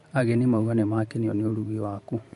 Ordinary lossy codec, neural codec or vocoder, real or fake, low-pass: MP3, 48 kbps; vocoder, 44.1 kHz, 128 mel bands every 512 samples, BigVGAN v2; fake; 14.4 kHz